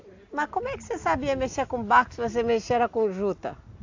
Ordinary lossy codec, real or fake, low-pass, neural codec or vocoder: AAC, 48 kbps; real; 7.2 kHz; none